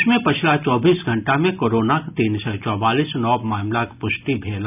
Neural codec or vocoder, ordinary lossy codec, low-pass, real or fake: none; none; 3.6 kHz; real